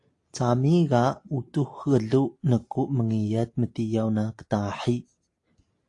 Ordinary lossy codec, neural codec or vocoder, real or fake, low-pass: AAC, 48 kbps; none; real; 10.8 kHz